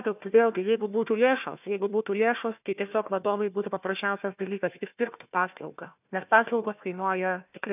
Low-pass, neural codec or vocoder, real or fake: 3.6 kHz; codec, 16 kHz, 1 kbps, FunCodec, trained on Chinese and English, 50 frames a second; fake